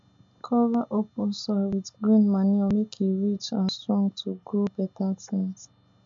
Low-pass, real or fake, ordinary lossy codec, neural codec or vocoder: 7.2 kHz; real; MP3, 64 kbps; none